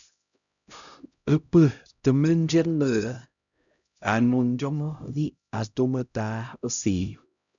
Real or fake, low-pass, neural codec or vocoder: fake; 7.2 kHz; codec, 16 kHz, 0.5 kbps, X-Codec, HuBERT features, trained on LibriSpeech